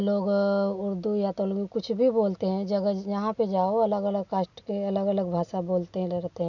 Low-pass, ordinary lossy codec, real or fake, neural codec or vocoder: 7.2 kHz; none; real; none